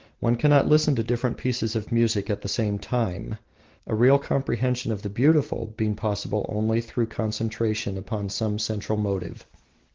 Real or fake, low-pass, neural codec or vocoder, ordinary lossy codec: real; 7.2 kHz; none; Opus, 16 kbps